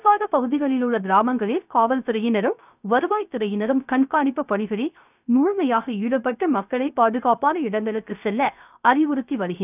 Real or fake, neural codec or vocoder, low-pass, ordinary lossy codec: fake; codec, 16 kHz, 0.3 kbps, FocalCodec; 3.6 kHz; none